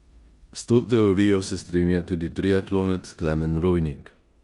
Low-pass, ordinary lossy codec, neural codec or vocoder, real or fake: 10.8 kHz; Opus, 64 kbps; codec, 16 kHz in and 24 kHz out, 0.9 kbps, LongCat-Audio-Codec, four codebook decoder; fake